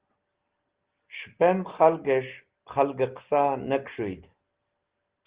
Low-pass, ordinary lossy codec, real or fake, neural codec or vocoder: 3.6 kHz; Opus, 16 kbps; real; none